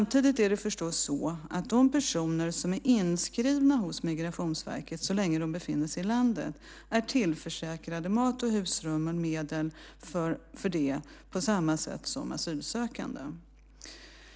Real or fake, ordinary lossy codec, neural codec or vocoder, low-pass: real; none; none; none